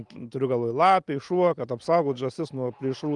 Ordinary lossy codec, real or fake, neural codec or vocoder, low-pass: Opus, 32 kbps; real; none; 10.8 kHz